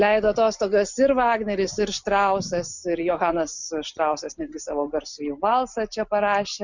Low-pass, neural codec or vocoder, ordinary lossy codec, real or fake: 7.2 kHz; none; Opus, 64 kbps; real